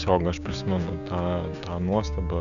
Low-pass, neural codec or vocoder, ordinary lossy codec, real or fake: 7.2 kHz; codec, 16 kHz, 6 kbps, DAC; MP3, 96 kbps; fake